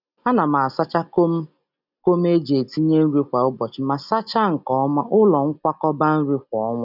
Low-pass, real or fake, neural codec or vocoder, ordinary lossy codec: 5.4 kHz; real; none; none